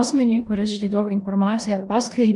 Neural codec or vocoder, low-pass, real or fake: codec, 16 kHz in and 24 kHz out, 0.9 kbps, LongCat-Audio-Codec, four codebook decoder; 10.8 kHz; fake